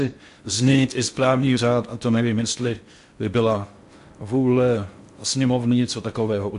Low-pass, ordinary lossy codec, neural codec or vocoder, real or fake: 10.8 kHz; MP3, 64 kbps; codec, 16 kHz in and 24 kHz out, 0.6 kbps, FocalCodec, streaming, 4096 codes; fake